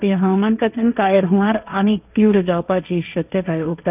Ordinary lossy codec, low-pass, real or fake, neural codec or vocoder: none; 3.6 kHz; fake; codec, 16 kHz, 1.1 kbps, Voila-Tokenizer